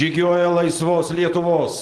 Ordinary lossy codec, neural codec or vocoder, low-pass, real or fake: Opus, 16 kbps; none; 10.8 kHz; real